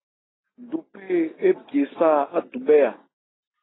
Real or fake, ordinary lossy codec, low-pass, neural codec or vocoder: real; AAC, 16 kbps; 7.2 kHz; none